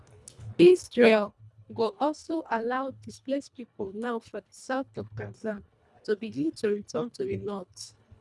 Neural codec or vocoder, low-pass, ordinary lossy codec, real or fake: codec, 24 kHz, 1.5 kbps, HILCodec; none; none; fake